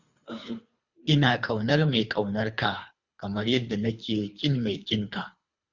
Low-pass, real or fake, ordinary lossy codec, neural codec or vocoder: 7.2 kHz; fake; Opus, 64 kbps; codec, 24 kHz, 3 kbps, HILCodec